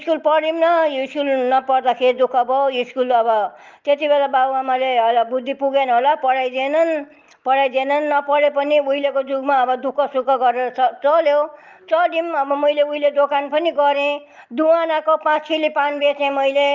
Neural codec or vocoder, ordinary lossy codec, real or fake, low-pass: none; Opus, 32 kbps; real; 7.2 kHz